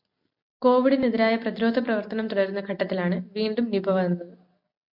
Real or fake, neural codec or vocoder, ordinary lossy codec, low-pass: real; none; MP3, 48 kbps; 5.4 kHz